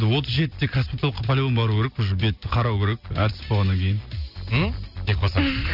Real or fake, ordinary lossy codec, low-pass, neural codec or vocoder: real; none; 5.4 kHz; none